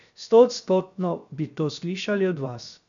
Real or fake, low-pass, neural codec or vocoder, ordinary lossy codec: fake; 7.2 kHz; codec, 16 kHz, 0.7 kbps, FocalCodec; none